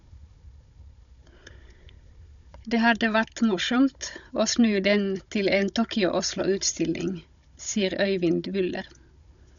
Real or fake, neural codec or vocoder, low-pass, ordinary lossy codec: fake; codec, 16 kHz, 16 kbps, FunCodec, trained on Chinese and English, 50 frames a second; 7.2 kHz; none